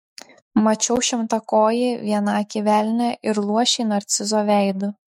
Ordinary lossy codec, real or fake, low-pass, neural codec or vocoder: MP3, 64 kbps; fake; 19.8 kHz; autoencoder, 48 kHz, 128 numbers a frame, DAC-VAE, trained on Japanese speech